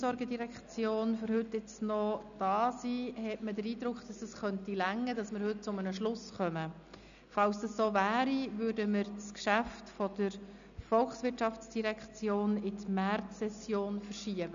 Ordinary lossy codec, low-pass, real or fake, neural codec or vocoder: none; 7.2 kHz; real; none